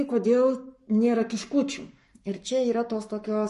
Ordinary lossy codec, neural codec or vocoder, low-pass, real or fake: MP3, 48 kbps; codec, 44.1 kHz, 7.8 kbps, Pupu-Codec; 14.4 kHz; fake